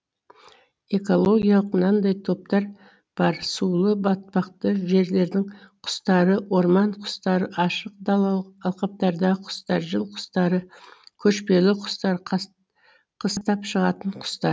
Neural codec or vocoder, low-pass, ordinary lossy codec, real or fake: none; none; none; real